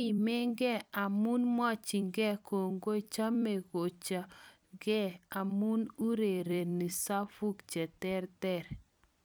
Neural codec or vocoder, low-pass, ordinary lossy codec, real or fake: vocoder, 44.1 kHz, 128 mel bands every 256 samples, BigVGAN v2; none; none; fake